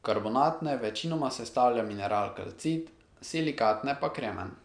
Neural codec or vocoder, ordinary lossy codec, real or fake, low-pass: none; none; real; 9.9 kHz